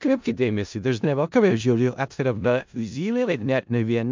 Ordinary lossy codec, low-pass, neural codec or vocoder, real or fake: MP3, 64 kbps; 7.2 kHz; codec, 16 kHz in and 24 kHz out, 0.4 kbps, LongCat-Audio-Codec, four codebook decoder; fake